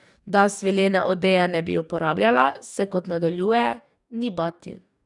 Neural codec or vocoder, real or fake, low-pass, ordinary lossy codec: codec, 44.1 kHz, 2.6 kbps, DAC; fake; 10.8 kHz; none